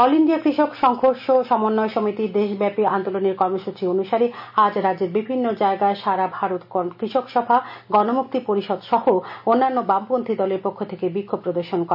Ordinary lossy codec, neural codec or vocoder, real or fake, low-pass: MP3, 48 kbps; none; real; 5.4 kHz